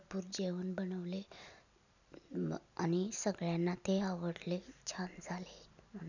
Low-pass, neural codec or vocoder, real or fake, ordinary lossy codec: 7.2 kHz; none; real; none